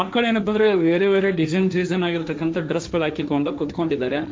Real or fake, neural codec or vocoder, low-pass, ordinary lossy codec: fake; codec, 16 kHz, 1.1 kbps, Voila-Tokenizer; none; none